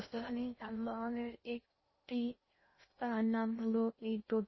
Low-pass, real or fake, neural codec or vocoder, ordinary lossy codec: 7.2 kHz; fake; codec, 16 kHz in and 24 kHz out, 0.6 kbps, FocalCodec, streaming, 2048 codes; MP3, 24 kbps